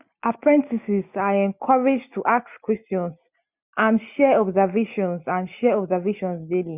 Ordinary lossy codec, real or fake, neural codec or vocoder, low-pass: none; real; none; 3.6 kHz